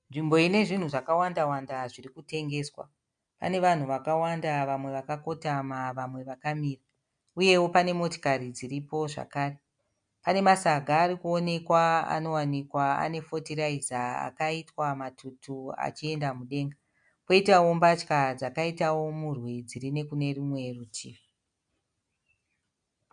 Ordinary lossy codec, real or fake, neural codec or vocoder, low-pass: MP3, 96 kbps; real; none; 9.9 kHz